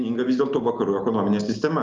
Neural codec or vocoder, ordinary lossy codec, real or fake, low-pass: none; Opus, 32 kbps; real; 7.2 kHz